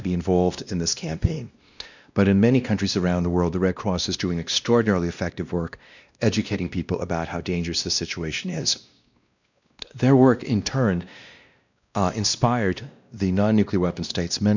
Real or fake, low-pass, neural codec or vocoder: fake; 7.2 kHz; codec, 16 kHz, 1 kbps, X-Codec, WavLM features, trained on Multilingual LibriSpeech